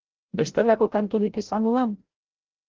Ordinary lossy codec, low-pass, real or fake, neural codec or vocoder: Opus, 16 kbps; 7.2 kHz; fake; codec, 16 kHz, 0.5 kbps, FreqCodec, larger model